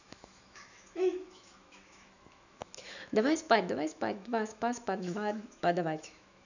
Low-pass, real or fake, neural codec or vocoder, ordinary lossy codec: 7.2 kHz; fake; autoencoder, 48 kHz, 128 numbers a frame, DAC-VAE, trained on Japanese speech; none